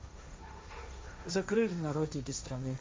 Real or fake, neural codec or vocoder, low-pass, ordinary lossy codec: fake; codec, 16 kHz, 1.1 kbps, Voila-Tokenizer; none; none